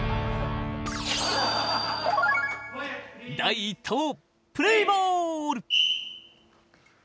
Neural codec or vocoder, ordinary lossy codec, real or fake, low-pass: none; none; real; none